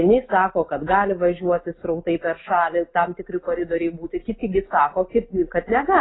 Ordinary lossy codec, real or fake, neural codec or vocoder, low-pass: AAC, 16 kbps; real; none; 7.2 kHz